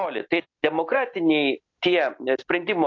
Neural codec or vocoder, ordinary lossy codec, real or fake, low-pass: none; AAC, 48 kbps; real; 7.2 kHz